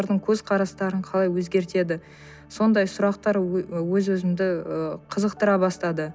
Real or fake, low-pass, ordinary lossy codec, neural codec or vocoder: real; none; none; none